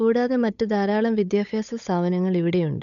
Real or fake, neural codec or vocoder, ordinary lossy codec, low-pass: fake; codec, 16 kHz, 8 kbps, FunCodec, trained on Chinese and English, 25 frames a second; none; 7.2 kHz